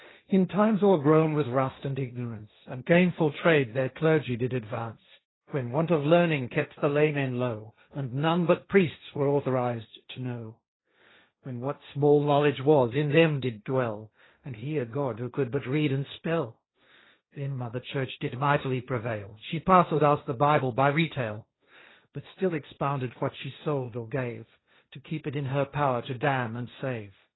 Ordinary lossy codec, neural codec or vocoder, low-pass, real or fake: AAC, 16 kbps; codec, 16 kHz, 1.1 kbps, Voila-Tokenizer; 7.2 kHz; fake